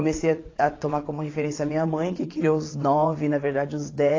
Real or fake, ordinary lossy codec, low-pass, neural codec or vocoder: fake; AAC, 32 kbps; 7.2 kHz; vocoder, 22.05 kHz, 80 mel bands, WaveNeXt